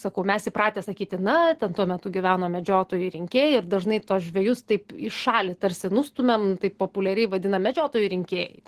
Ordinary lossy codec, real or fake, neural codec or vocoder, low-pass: Opus, 16 kbps; real; none; 14.4 kHz